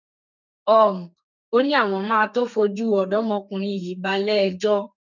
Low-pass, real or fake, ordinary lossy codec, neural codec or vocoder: 7.2 kHz; fake; none; codec, 32 kHz, 1.9 kbps, SNAC